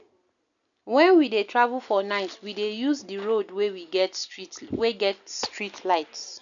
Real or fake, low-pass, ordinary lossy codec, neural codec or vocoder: real; 7.2 kHz; none; none